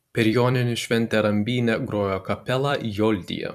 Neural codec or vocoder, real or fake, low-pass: vocoder, 44.1 kHz, 128 mel bands every 256 samples, BigVGAN v2; fake; 14.4 kHz